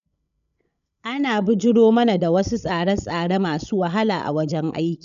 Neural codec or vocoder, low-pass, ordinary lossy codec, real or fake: codec, 16 kHz, 16 kbps, FreqCodec, larger model; 7.2 kHz; none; fake